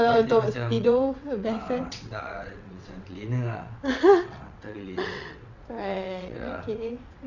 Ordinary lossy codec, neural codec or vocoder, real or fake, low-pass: none; vocoder, 22.05 kHz, 80 mel bands, WaveNeXt; fake; 7.2 kHz